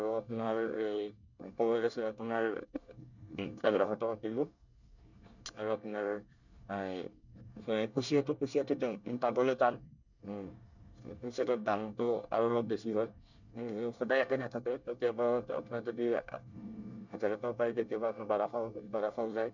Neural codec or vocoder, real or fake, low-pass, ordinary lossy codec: codec, 24 kHz, 1 kbps, SNAC; fake; 7.2 kHz; none